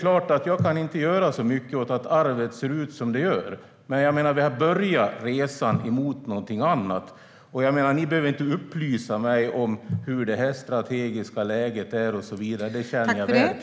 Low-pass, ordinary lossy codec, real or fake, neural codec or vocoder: none; none; real; none